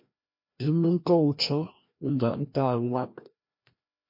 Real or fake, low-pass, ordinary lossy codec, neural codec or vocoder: fake; 5.4 kHz; MP3, 32 kbps; codec, 16 kHz, 1 kbps, FreqCodec, larger model